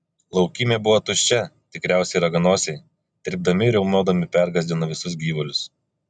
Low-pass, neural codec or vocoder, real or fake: 9.9 kHz; none; real